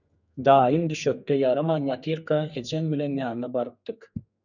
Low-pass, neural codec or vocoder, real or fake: 7.2 kHz; codec, 32 kHz, 1.9 kbps, SNAC; fake